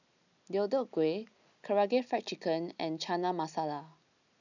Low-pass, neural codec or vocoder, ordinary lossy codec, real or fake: 7.2 kHz; none; none; real